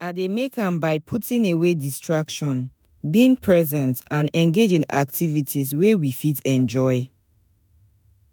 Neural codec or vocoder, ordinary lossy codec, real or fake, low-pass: autoencoder, 48 kHz, 32 numbers a frame, DAC-VAE, trained on Japanese speech; none; fake; none